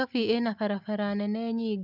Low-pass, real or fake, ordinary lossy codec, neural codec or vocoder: 5.4 kHz; real; none; none